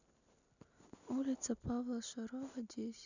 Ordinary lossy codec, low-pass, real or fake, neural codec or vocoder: none; 7.2 kHz; real; none